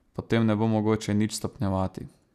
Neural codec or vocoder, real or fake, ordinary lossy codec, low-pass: none; real; none; 14.4 kHz